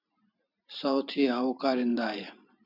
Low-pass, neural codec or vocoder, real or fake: 5.4 kHz; vocoder, 44.1 kHz, 128 mel bands every 512 samples, BigVGAN v2; fake